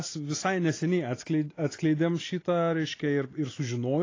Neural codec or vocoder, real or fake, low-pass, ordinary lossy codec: none; real; 7.2 kHz; AAC, 32 kbps